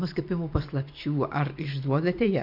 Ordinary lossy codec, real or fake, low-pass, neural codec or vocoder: MP3, 48 kbps; fake; 5.4 kHz; vocoder, 44.1 kHz, 128 mel bands every 512 samples, BigVGAN v2